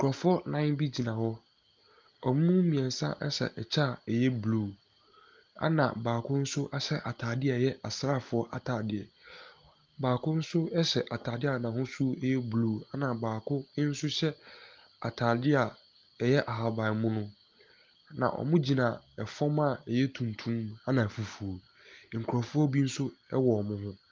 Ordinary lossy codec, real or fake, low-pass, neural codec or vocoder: Opus, 32 kbps; real; 7.2 kHz; none